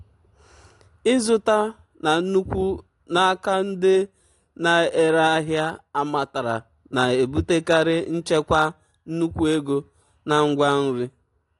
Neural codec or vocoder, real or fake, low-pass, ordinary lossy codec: none; real; 10.8 kHz; AAC, 48 kbps